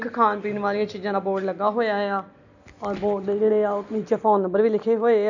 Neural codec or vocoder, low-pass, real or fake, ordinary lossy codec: none; 7.2 kHz; real; none